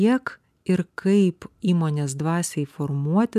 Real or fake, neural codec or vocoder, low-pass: real; none; 14.4 kHz